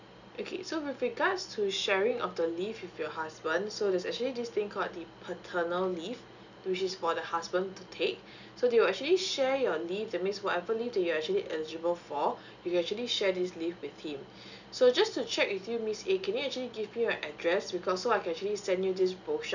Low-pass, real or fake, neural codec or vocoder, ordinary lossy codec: 7.2 kHz; real; none; none